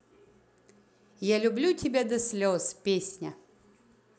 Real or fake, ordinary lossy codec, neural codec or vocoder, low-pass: real; none; none; none